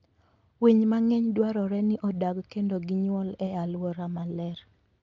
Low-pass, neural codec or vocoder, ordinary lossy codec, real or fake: 7.2 kHz; none; Opus, 24 kbps; real